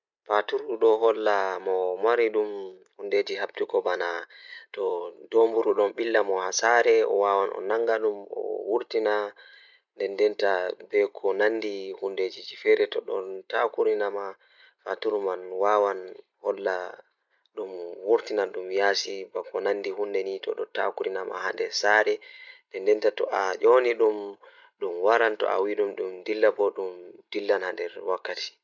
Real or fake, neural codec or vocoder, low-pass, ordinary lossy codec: real; none; 7.2 kHz; none